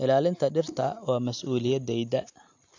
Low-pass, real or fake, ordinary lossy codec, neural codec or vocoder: 7.2 kHz; real; AAC, 48 kbps; none